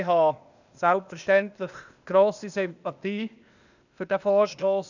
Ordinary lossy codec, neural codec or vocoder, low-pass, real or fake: none; codec, 16 kHz, 0.8 kbps, ZipCodec; 7.2 kHz; fake